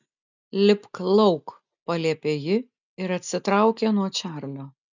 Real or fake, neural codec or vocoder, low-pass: real; none; 7.2 kHz